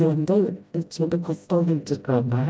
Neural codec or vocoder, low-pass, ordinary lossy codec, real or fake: codec, 16 kHz, 0.5 kbps, FreqCodec, smaller model; none; none; fake